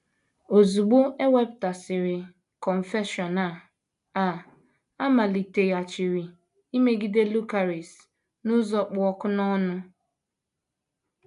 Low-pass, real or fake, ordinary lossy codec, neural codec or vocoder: 10.8 kHz; real; MP3, 96 kbps; none